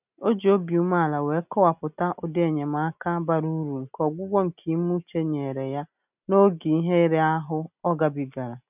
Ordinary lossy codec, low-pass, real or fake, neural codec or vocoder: none; 3.6 kHz; real; none